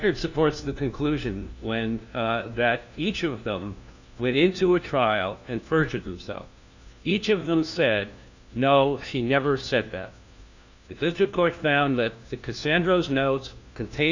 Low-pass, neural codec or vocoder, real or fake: 7.2 kHz; codec, 16 kHz, 1 kbps, FunCodec, trained on LibriTTS, 50 frames a second; fake